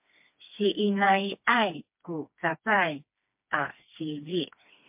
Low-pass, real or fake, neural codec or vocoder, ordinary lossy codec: 3.6 kHz; fake; codec, 16 kHz, 2 kbps, FreqCodec, smaller model; MP3, 32 kbps